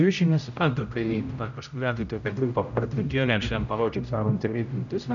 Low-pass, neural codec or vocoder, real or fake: 7.2 kHz; codec, 16 kHz, 0.5 kbps, X-Codec, HuBERT features, trained on general audio; fake